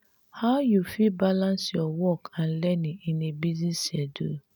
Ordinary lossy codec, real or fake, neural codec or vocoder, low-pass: none; real; none; 19.8 kHz